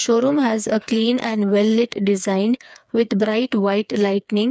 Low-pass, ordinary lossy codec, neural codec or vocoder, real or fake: none; none; codec, 16 kHz, 4 kbps, FreqCodec, smaller model; fake